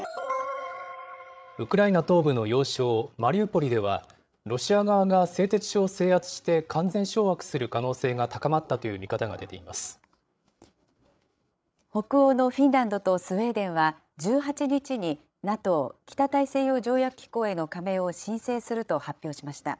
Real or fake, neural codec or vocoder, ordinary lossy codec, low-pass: fake; codec, 16 kHz, 8 kbps, FreqCodec, larger model; none; none